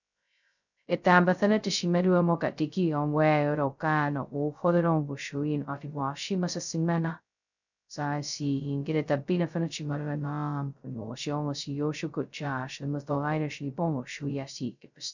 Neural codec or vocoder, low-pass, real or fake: codec, 16 kHz, 0.2 kbps, FocalCodec; 7.2 kHz; fake